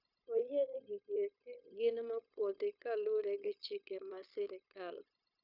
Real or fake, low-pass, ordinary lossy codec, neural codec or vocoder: fake; 5.4 kHz; none; codec, 16 kHz, 0.9 kbps, LongCat-Audio-Codec